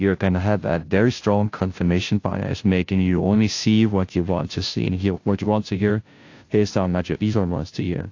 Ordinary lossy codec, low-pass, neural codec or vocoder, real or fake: AAC, 48 kbps; 7.2 kHz; codec, 16 kHz, 0.5 kbps, FunCodec, trained on Chinese and English, 25 frames a second; fake